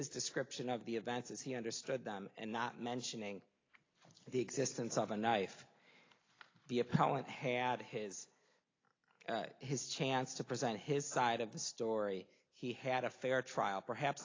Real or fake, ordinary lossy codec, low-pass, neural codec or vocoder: real; AAC, 32 kbps; 7.2 kHz; none